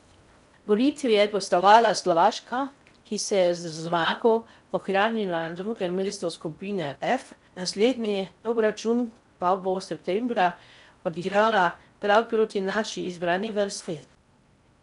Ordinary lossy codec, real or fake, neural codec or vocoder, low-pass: MP3, 96 kbps; fake; codec, 16 kHz in and 24 kHz out, 0.6 kbps, FocalCodec, streaming, 4096 codes; 10.8 kHz